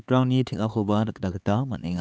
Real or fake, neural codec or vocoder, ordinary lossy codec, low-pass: fake; codec, 16 kHz, 4 kbps, X-Codec, HuBERT features, trained on LibriSpeech; none; none